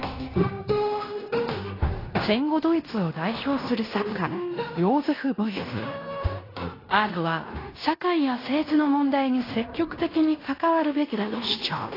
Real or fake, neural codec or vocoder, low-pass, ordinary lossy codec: fake; codec, 16 kHz in and 24 kHz out, 0.9 kbps, LongCat-Audio-Codec, fine tuned four codebook decoder; 5.4 kHz; AAC, 24 kbps